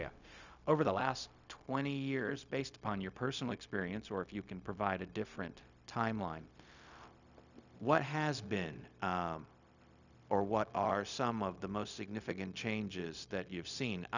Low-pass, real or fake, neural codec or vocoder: 7.2 kHz; fake; codec, 16 kHz, 0.4 kbps, LongCat-Audio-Codec